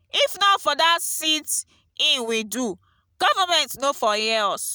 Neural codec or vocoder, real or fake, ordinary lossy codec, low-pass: none; real; none; none